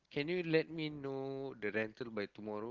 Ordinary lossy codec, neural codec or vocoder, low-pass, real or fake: Opus, 16 kbps; none; 7.2 kHz; real